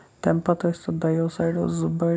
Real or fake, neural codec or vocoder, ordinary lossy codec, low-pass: real; none; none; none